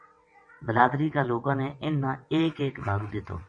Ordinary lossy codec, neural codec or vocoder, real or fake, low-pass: MP3, 48 kbps; vocoder, 22.05 kHz, 80 mel bands, WaveNeXt; fake; 9.9 kHz